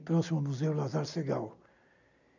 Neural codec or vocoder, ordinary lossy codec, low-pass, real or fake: none; none; 7.2 kHz; real